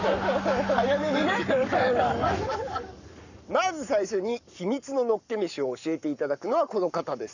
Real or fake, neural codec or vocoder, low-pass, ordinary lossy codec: fake; codec, 44.1 kHz, 7.8 kbps, Pupu-Codec; 7.2 kHz; none